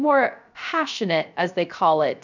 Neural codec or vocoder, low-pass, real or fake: codec, 16 kHz, 0.3 kbps, FocalCodec; 7.2 kHz; fake